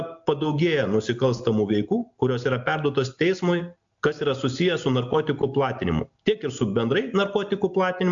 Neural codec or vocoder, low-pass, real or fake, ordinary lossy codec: none; 7.2 kHz; real; AAC, 64 kbps